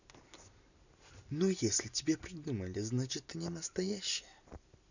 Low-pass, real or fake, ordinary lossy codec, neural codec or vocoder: 7.2 kHz; real; none; none